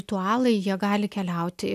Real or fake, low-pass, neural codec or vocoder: real; 14.4 kHz; none